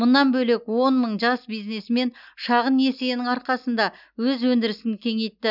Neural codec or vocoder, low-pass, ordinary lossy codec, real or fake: none; 5.4 kHz; none; real